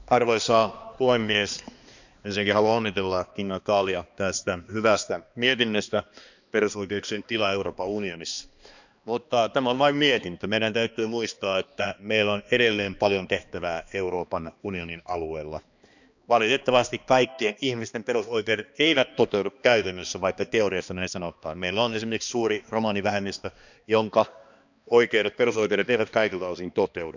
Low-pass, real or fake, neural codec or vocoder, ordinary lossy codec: 7.2 kHz; fake; codec, 16 kHz, 2 kbps, X-Codec, HuBERT features, trained on balanced general audio; none